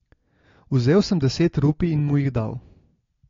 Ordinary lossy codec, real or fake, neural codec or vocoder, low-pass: AAC, 32 kbps; real; none; 7.2 kHz